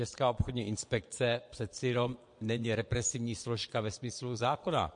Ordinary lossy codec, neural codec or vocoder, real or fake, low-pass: MP3, 48 kbps; vocoder, 22.05 kHz, 80 mel bands, Vocos; fake; 9.9 kHz